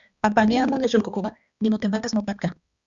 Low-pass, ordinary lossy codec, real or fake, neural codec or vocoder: 7.2 kHz; Opus, 64 kbps; fake; codec, 16 kHz, 2 kbps, X-Codec, HuBERT features, trained on balanced general audio